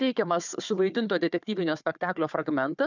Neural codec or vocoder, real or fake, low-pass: codec, 16 kHz, 4 kbps, FunCodec, trained on Chinese and English, 50 frames a second; fake; 7.2 kHz